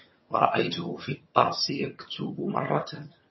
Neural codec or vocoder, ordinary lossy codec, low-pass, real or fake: vocoder, 22.05 kHz, 80 mel bands, HiFi-GAN; MP3, 24 kbps; 7.2 kHz; fake